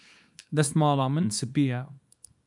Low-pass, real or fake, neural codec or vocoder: 10.8 kHz; fake; codec, 24 kHz, 0.9 kbps, WavTokenizer, small release